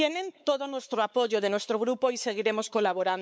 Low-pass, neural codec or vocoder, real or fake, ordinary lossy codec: none; codec, 16 kHz, 4 kbps, X-Codec, WavLM features, trained on Multilingual LibriSpeech; fake; none